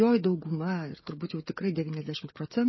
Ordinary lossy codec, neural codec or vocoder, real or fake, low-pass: MP3, 24 kbps; codec, 16 kHz, 8 kbps, FreqCodec, smaller model; fake; 7.2 kHz